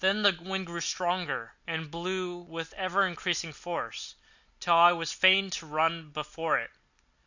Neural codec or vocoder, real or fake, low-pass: none; real; 7.2 kHz